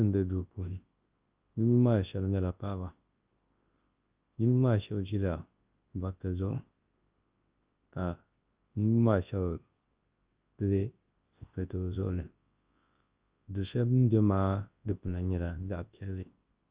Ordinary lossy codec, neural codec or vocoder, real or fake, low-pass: Opus, 32 kbps; codec, 24 kHz, 0.9 kbps, WavTokenizer, large speech release; fake; 3.6 kHz